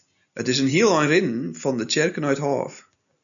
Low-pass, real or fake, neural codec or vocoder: 7.2 kHz; real; none